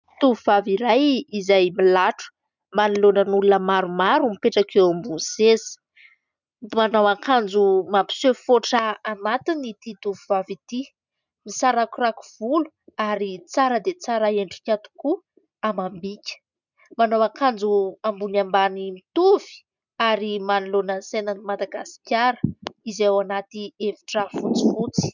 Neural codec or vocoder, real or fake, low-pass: none; real; 7.2 kHz